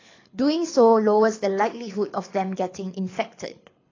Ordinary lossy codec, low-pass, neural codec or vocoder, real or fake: AAC, 32 kbps; 7.2 kHz; codec, 24 kHz, 6 kbps, HILCodec; fake